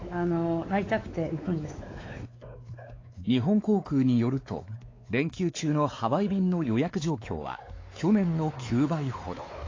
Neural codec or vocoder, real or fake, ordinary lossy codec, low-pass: codec, 16 kHz, 4 kbps, X-Codec, WavLM features, trained on Multilingual LibriSpeech; fake; AAC, 32 kbps; 7.2 kHz